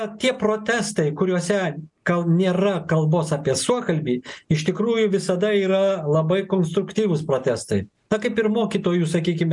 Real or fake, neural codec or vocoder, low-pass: real; none; 10.8 kHz